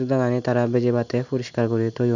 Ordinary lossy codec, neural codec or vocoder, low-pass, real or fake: none; none; 7.2 kHz; real